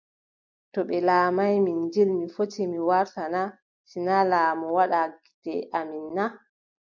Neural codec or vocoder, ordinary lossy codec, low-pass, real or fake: none; MP3, 64 kbps; 7.2 kHz; real